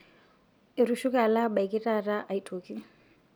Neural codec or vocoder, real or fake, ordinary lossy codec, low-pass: none; real; none; none